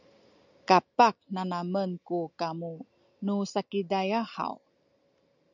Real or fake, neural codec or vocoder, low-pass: real; none; 7.2 kHz